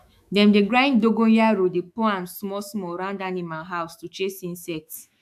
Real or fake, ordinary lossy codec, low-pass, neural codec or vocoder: fake; AAC, 96 kbps; 14.4 kHz; autoencoder, 48 kHz, 128 numbers a frame, DAC-VAE, trained on Japanese speech